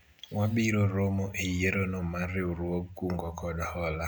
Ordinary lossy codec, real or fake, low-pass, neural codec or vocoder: none; real; none; none